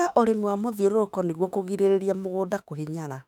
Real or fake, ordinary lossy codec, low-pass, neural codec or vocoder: fake; none; 19.8 kHz; autoencoder, 48 kHz, 32 numbers a frame, DAC-VAE, trained on Japanese speech